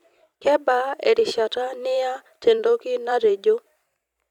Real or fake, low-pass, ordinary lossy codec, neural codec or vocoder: fake; 19.8 kHz; none; vocoder, 44.1 kHz, 128 mel bands every 256 samples, BigVGAN v2